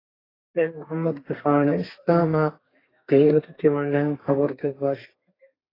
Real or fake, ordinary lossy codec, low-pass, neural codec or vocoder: fake; AAC, 24 kbps; 5.4 kHz; codec, 32 kHz, 1.9 kbps, SNAC